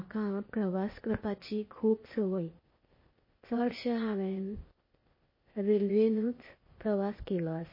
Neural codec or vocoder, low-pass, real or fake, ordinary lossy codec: codec, 16 kHz, 0.8 kbps, ZipCodec; 5.4 kHz; fake; MP3, 24 kbps